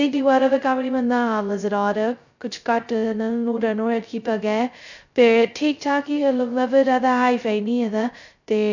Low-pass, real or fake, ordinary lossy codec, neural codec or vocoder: 7.2 kHz; fake; none; codec, 16 kHz, 0.2 kbps, FocalCodec